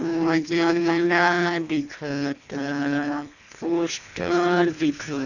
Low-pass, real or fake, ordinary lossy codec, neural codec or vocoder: 7.2 kHz; fake; none; codec, 24 kHz, 1.5 kbps, HILCodec